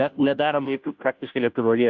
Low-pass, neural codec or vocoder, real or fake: 7.2 kHz; codec, 16 kHz, 0.5 kbps, FunCodec, trained on Chinese and English, 25 frames a second; fake